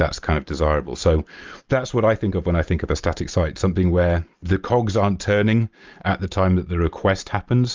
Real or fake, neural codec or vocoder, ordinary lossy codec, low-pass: real; none; Opus, 24 kbps; 7.2 kHz